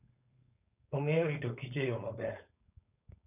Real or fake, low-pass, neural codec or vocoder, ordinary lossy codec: fake; 3.6 kHz; codec, 16 kHz, 4.8 kbps, FACodec; none